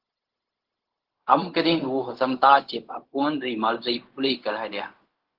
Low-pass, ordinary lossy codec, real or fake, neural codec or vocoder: 5.4 kHz; Opus, 16 kbps; fake; codec, 16 kHz, 0.4 kbps, LongCat-Audio-Codec